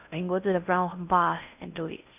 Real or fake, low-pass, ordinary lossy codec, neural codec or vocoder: fake; 3.6 kHz; none; codec, 16 kHz in and 24 kHz out, 0.6 kbps, FocalCodec, streaming, 2048 codes